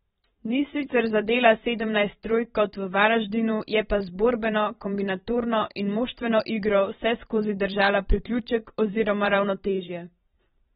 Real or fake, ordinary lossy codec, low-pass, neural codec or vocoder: fake; AAC, 16 kbps; 19.8 kHz; vocoder, 44.1 kHz, 128 mel bands every 512 samples, BigVGAN v2